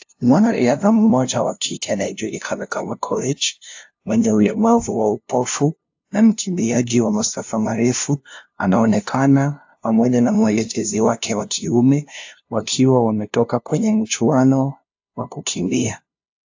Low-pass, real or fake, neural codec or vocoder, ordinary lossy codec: 7.2 kHz; fake; codec, 16 kHz, 0.5 kbps, FunCodec, trained on LibriTTS, 25 frames a second; AAC, 48 kbps